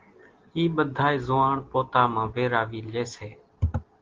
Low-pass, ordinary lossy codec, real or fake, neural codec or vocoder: 7.2 kHz; Opus, 16 kbps; real; none